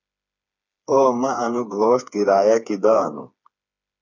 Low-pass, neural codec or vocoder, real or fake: 7.2 kHz; codec, 16 kHz, 4 kbps, FreqCodec, smaller model; fake